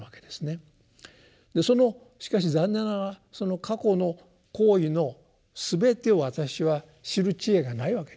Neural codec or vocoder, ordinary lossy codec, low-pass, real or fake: none; none; none; real